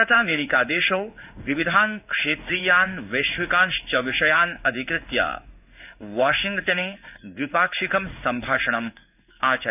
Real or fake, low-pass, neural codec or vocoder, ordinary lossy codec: fake; 3.6 kHz; codec, 16 kHz in and 24 kHz out, 1 kbps, XY-Tokenizer; none